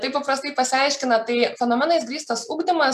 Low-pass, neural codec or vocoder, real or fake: 14.4 kHz; none; real